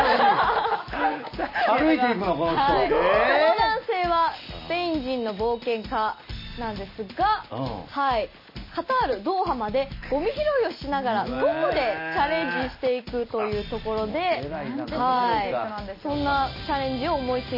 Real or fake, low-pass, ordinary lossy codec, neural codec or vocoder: real; 5.4 kHz; MP3, 24 kbps; none